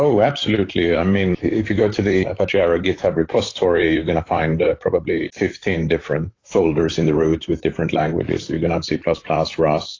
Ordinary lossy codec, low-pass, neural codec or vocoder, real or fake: AAC, 32 kbps; 7.2 kHz; vocoder, 44.1 kHz, 128 mel bands, Pupu-Vocoder; fake